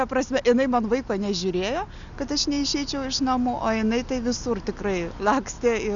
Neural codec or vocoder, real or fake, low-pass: none; real; 7.2 kHz